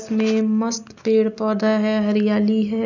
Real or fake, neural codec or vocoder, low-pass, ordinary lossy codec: real; none; 7.2 kHz; none